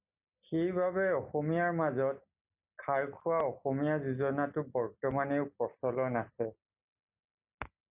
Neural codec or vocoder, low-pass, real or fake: none; 3.6 kHz; real